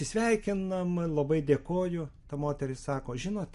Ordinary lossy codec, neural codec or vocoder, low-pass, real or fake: MP3, 48 kbps; none; 14.4 kHz; real